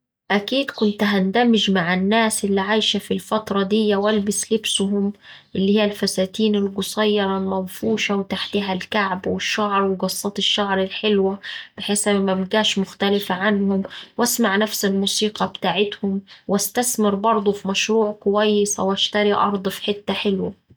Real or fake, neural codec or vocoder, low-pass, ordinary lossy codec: real; none; none; none